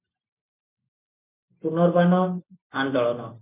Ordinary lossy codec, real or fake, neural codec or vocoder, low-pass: MP3, 32 kbps; real; none; 3.6 kHz